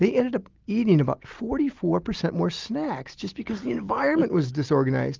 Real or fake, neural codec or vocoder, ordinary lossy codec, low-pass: real; none; Opus, 32 kbps; 7.2 kHz